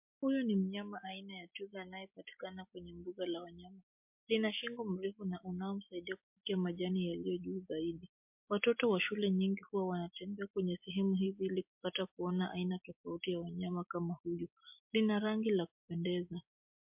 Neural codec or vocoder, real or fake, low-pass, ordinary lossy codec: none; real; 3.6 kHz; MP3, 32 kbps